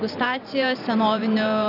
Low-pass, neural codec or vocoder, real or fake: 5.4 kHz; vocoder, 44.1 kHz, 128 mel bands every 512 samples, BigVGAN v2; fake